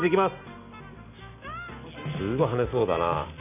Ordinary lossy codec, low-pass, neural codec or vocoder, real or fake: none; 3.6 kHz; none; real